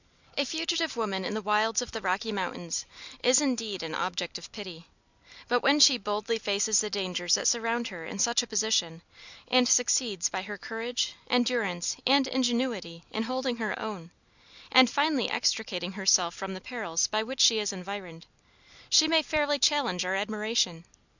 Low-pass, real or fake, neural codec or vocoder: 7.2 kHz; real; none